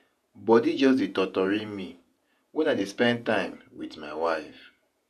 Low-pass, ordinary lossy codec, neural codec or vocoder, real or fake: 14.4 kHz; none; vocoder, 48 kHz, 128 mel bands, Vocos; fake